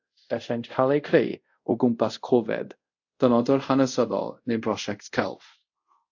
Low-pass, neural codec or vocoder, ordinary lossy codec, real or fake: 7.2 kHz; codec, 24 kHz, 0.5 kbps, DualCodec; AAC, 48 kbps; fake